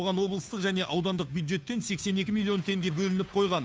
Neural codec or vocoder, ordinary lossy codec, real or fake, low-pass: codec, 16 kHz, 2 kbps, FunCodec, trained on Chinese and English, 25 frames a second; none; fake; none